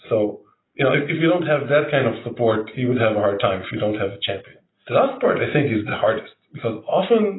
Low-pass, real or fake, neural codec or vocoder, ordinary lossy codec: 7.2 kHz; real; none; AAC, 16 kbps